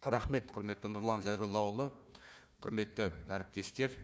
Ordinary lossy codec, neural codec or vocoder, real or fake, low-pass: none; codec, 16 kHz, 1 kbps, FunCodec, trained on Chinese and English, 50 frames a second; fake; none